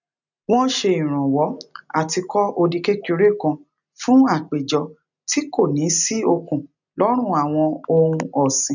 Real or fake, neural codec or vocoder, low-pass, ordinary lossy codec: real; none; 7.2 kHz; none